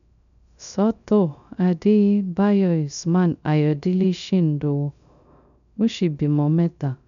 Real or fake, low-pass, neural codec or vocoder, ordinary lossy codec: fake; 7.2 kHz; codec, 16 kHz, 0.3 kbps, FocalCodec; none